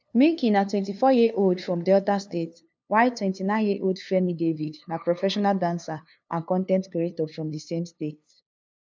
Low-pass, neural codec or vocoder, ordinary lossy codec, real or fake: none; codec, 16 kHz, 2 kbps, FunCodec, trained on LibriTTS, 25 frames a second; none; fake